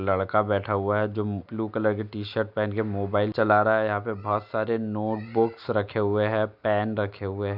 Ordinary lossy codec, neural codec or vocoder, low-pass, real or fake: none; none; 5.4 kHz; real